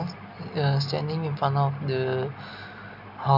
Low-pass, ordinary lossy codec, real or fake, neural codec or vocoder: 5.4 kHz; none; real; none